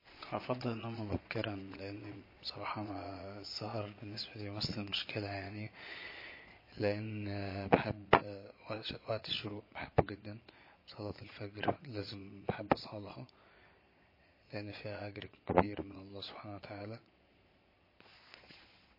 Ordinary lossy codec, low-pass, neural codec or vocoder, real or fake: MP3, 24 kbps; 5.4 kHz; vocoder, 22.05 kHz, 80 mel bands, Vocos; fake